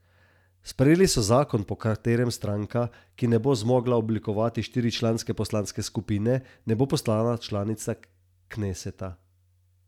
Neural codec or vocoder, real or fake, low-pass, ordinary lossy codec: none; real; 19.8 kHz; none